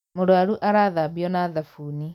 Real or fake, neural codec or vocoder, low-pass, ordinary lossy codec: real; none; 19.8 kHz; none